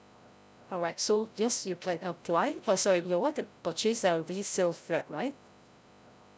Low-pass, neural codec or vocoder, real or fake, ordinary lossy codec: none; codec, 16 kHz, 0.5 kbps, FreqCodec, larger model; fake; none